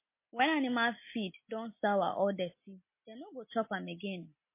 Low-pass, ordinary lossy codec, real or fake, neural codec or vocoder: 3.6 kHz; MP3, 24 kbps; real; none